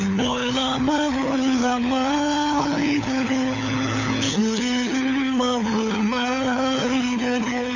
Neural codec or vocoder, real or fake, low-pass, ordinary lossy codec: codec, 16 kHz, 4 kbps, FunCodec, trained on LibriTTS, 50 frames a second; fake; 7.2 kHz; none